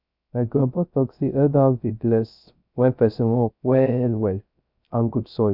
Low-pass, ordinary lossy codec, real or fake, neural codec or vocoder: 5.4 kHz; none; fake; codec, 16 kHz, 0.3 kbps, FocalCodec